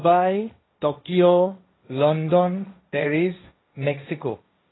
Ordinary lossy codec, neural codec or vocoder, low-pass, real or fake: AAC, 16 kbps; codec, 16 kHz, 1.1 kbps, Voila-Tokenizer; 7.2 kHz; fake